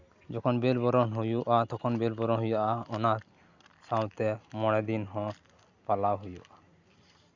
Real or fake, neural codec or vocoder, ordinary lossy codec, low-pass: real; none; Opus, 64 kbps; 7.2 kHz